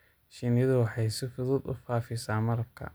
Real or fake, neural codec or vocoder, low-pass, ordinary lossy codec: real; none; none; none